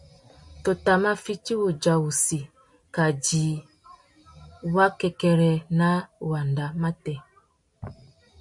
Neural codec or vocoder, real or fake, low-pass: none; real; 10.8 kHz